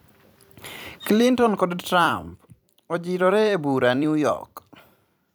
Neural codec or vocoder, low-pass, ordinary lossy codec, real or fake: vocoder, 44.1 kHz, 128 mel bands every 256 samples, BigVGAN v2; none; none; fake